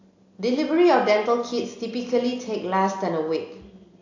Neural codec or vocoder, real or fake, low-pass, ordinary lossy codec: none; real; 7.2 kHz; AAC, 48 kbps